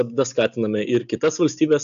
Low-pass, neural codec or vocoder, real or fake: 7.2 kHz; none; real